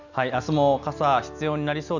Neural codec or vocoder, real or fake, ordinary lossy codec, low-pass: none; real; none; 7.2 kHz